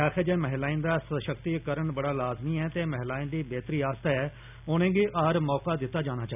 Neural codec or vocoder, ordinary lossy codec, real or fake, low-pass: none; none; real; 3.6 kHz